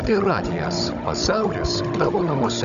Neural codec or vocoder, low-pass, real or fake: codec, 16 kHz, 16 kbps, FunCodec, trained on Chinese and English, 50 frames a second; 7.2 kHz; fake